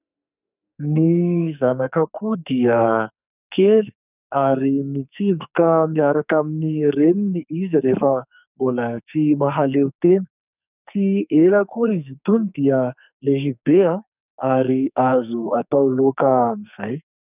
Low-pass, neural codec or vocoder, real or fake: 3.6 kHz; codec, 44.1 kHz, 2.6 kbps, SNAC; fake